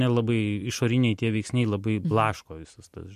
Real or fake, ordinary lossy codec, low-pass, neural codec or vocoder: real; MP3, 64 kbps; 14.4 kHz; none